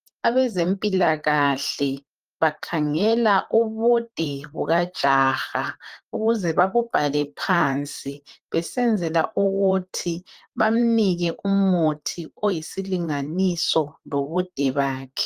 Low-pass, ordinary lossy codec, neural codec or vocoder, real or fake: 14.4 kHz; Opus, 32 kbps; vocoder, 44.1 kHz, 128 mel bands, Pupu-Vocoder; fake